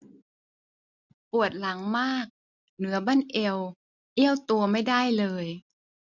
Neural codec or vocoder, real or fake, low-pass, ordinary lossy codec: none; real; 7.2 kHz; none